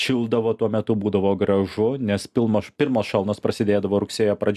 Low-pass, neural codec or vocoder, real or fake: 14.4 kHz; none; real